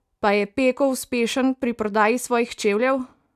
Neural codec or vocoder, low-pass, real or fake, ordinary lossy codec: none; 14.4 kHz; real; none